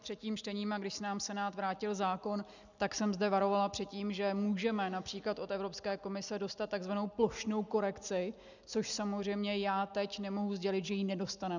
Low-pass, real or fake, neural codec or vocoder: 7.2 kHz; real; none